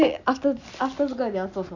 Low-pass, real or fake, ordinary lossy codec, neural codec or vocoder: 7.2 kHz; real; none; none